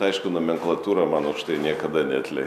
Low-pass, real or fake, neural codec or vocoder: 14.4 kHz; real; none